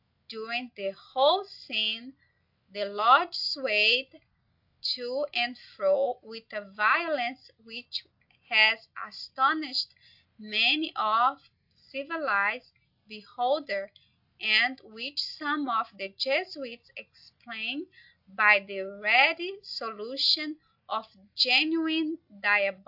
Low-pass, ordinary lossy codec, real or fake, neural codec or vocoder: 5.4 kHz; AAC, 48 kbps; real; none